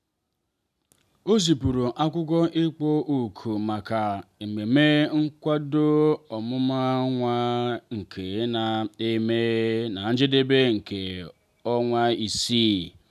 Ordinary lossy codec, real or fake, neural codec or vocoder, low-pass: none; real; none; 14.4 kHz